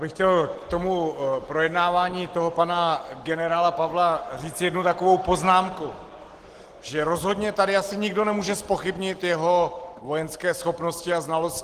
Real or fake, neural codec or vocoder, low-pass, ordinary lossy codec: real; none; 14.4 kHz; Opus, 16 kbps